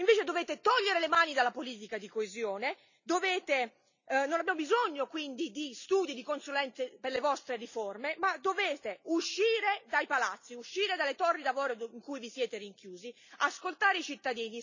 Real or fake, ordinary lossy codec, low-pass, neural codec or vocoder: real; none; 7.2 kHz; none